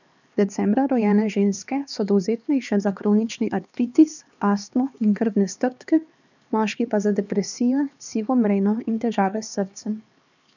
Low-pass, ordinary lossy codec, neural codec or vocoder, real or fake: 7.2 kHz; none; codec, 16 kHz, 2 kbps, X-Codec, HuBERT features, trained on LibriSpeech; fake